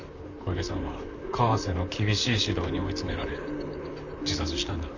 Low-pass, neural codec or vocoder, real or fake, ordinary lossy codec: 7.2 kHz; vocoder, 44.1 kHz, 128 mel bands, Pupu-Vocoder; fake; none